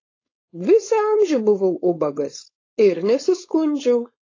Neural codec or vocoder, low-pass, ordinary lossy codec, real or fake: codec, 16 kHz, 4.8 kbps, FACodec; 7.2 kHz; AAC, 32 kbps; fake